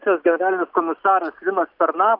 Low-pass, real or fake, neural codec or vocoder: 5.4 kHz; real; none